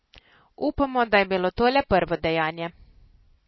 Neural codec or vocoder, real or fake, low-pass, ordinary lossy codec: none; real; 7.2 kHz; MP3, 24 kbps